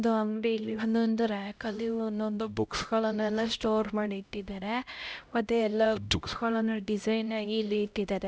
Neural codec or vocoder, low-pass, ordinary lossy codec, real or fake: codec, 16 kHz, 0.5 kbps, X-Codec, HuBERT features, trained on LibriSpeech; none; none; fake